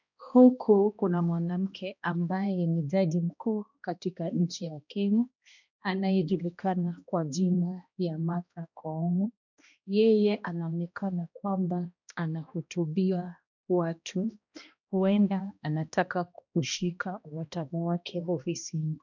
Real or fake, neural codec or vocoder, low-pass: fake; codec, 16 kHz, 1 kbps, X-Codec, HuBERT features, trained on balanced general audio; 7.2 kHz